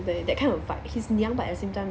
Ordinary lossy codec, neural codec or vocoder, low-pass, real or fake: none; none; none; real